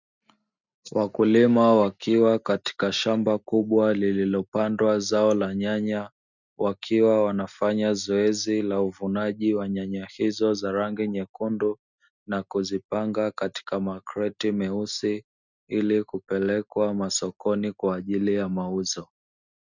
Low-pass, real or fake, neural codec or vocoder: 7.2 kHz; real; none